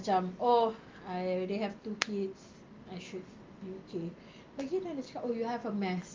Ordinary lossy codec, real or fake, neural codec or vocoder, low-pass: Opus, 32 kbps; real; none; 7.2 kHz